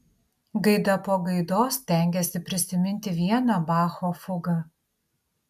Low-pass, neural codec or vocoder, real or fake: 14.4 kHz; none; real